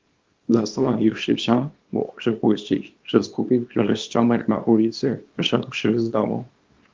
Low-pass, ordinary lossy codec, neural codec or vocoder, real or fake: 7.2 kHz; Opus, 32 kbps; codec, 24 kHz, 0.9 kbps, WavTokenizer, small release; fake